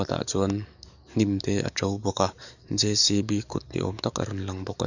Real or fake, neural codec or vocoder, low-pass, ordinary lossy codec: fake; codec, 44.1 kHz, 7.8 kbps, DAC; 7.2 kHz; none